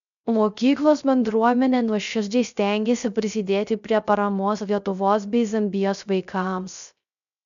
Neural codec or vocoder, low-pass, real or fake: codec, 16 kHz, 0.3 kbps, FocalCodec; 7.2 kHz; fake